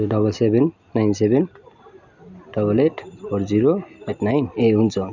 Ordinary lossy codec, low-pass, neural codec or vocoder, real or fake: none; 7.2 kHz; none; real